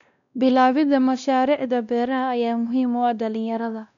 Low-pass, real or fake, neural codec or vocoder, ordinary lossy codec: 7.2 kHz; fake; codec, 16 kHz, 1 kbps, X-Codec, WavLM features, trained on Multilingual LibriSpeech; none